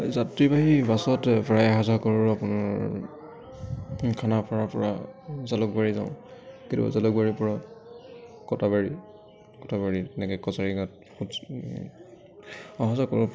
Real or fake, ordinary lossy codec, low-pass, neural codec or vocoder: real; none; none; none